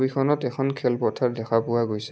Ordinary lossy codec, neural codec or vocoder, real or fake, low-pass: none; none; real; none